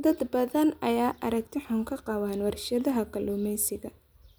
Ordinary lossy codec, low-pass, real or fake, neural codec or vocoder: none; none; real; none